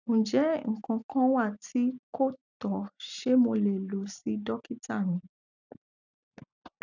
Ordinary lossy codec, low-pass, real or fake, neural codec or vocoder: none; 7.2 kHz; real; none